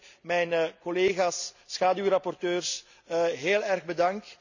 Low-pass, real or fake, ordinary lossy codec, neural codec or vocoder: 7.2 kHz; real; none; none